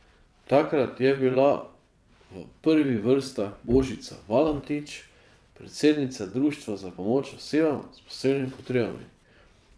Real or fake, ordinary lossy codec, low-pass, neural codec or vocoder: fake; none; none; vocoder, 22.05 kHz, 80 mel bands, WaveNeXt